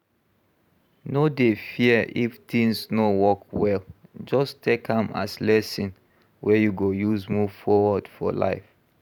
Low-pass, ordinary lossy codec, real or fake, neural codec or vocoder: 19.8 kHz; none; fake; vocoder, 44.1 kHz, 128 mel bands every 512 samples, BigVGAN v2